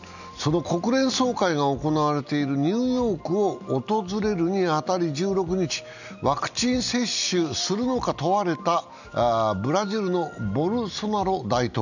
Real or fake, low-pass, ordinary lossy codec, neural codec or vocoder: real; 7.2 kHz; none; none